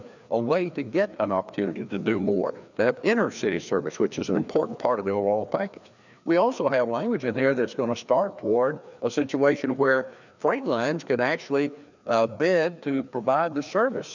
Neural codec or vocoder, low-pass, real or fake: codec, 16 kHz, 2 kbps, FreqCodec, larger model; 7.2 kHz; fake